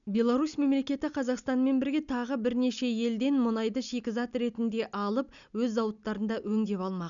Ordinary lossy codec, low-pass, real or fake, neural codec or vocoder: none; 7.2 kHz; real; none